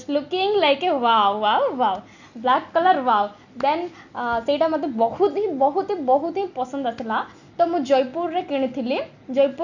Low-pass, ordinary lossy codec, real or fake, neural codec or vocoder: 7.2 kHz; none; real; none